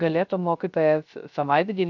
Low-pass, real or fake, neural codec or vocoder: 7.2 kHz; fake; codec, 16 kHz, 0.3 kbps, FocalCodec